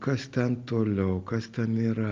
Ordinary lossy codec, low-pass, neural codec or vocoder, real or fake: Opus, 32 kbps; 7.2 kHz; none; real